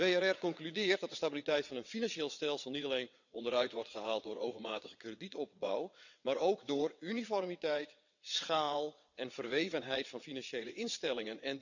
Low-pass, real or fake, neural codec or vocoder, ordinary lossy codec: 7.2 kHz; fake; vocoder, 22.05 kHz, 80 mel bands, WaveNeXt; none